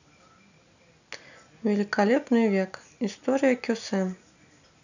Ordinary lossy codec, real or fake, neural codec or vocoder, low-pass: none; real; none; 7.2 kHz